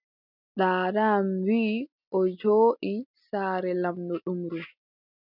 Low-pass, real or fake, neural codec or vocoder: 5.4 kHz; real; none